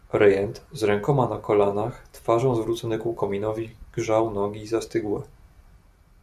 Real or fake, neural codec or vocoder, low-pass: real; none; 14.4 kHz